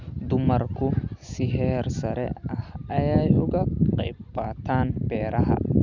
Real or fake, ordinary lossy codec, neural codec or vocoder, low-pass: real; none; none; 7.2 kHz